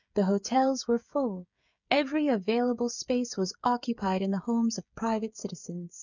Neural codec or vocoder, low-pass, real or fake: codec, 16 kHz, 16 kbps, FreqCodec, smaller model; 7.2 kHz; fake